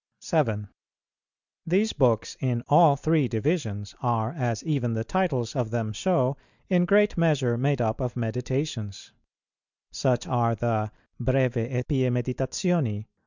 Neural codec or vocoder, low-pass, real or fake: none; 7.2 kHz; real